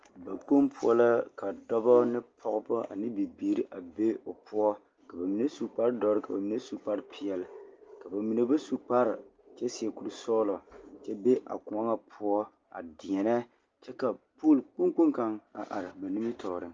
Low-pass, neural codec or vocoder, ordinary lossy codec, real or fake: 7.2 kHz; none; Opus, 24 kbps; real